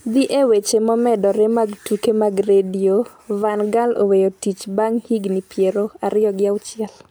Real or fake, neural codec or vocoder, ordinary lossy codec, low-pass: real; none; none; none